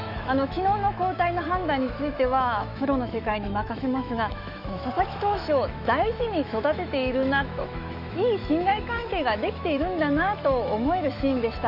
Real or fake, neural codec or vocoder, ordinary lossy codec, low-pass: fake; autoencoder, 48 kHz, 128 numbers a frame, DAC-VAE, trained on Japanese speech; none; 5.4 kHz